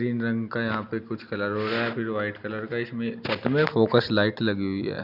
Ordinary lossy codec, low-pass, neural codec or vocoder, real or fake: none; 5.4 kHz; none; real